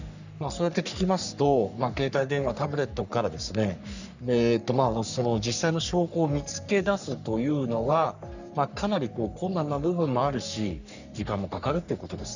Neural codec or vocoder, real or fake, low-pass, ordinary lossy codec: codec, 44.1 kHz, 3.4 kbps, Pupu-Codec; fake; 7.2 kHz; none